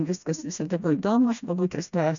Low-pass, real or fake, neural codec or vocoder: 7.2 kHz; fake; codec, 16 kHz, 1 kbps, FreqCodec, smaller model